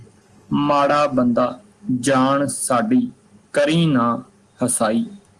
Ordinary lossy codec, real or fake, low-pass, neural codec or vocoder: Opus, 24 kbps; real; 10.8 kHz; none